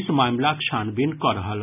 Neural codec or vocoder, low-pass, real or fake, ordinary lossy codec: none; 3.6 kHz; real; none